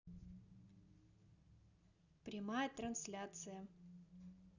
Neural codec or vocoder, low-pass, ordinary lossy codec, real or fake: none; 7.2 kHz; none; real